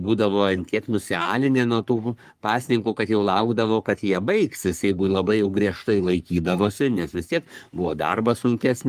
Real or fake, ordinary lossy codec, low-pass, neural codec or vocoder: fake; Opus, 32 kbps; 14.4 kHz; codec, 44.1 kHz, 3.4 kbps, Pupu-Codec